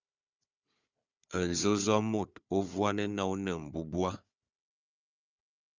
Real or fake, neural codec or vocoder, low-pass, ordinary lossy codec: fake; codec, 16 kHz, 4 kbps, FunCodec, trained on Chinese and English, 50 frames a second; 7.2 kHz; Opus, 64 kbps